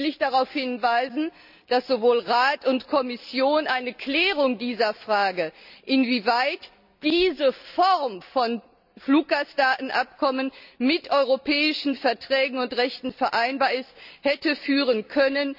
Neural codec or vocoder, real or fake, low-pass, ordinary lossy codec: none; real; 5.4 kHz; none